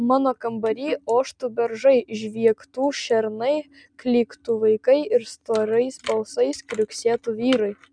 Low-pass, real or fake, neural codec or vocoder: 9.9 kHz; real; none